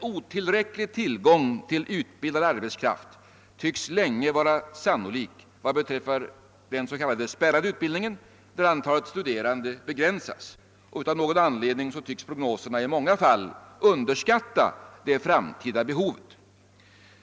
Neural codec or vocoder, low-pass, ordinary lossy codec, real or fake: none; none; none; real